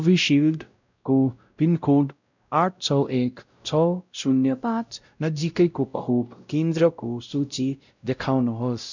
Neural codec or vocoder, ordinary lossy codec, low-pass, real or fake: codec, 16 kHz, 0.5 kbps, X-Codec, WavLM features, trained on Multilingual LibriSpeech; none; 7.2 kHz; fake